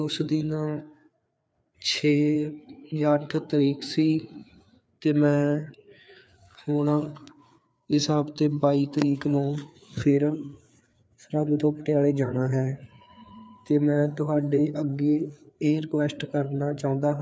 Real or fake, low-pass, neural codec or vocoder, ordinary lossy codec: fake; none; codec, 16 kHz, 4 kbps, FreqCodec, larger model; none